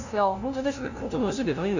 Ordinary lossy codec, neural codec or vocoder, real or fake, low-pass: none; codec, 16 kHz, 0.5 kbps, FunCodec, trained on LibriTTS, 25 frames a second; fake; 7.2 kHz